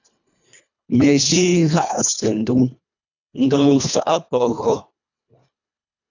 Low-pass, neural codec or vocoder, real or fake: 7.2 kHz; codec, 24 kHz, 1.5 kbps, HILCodec; fake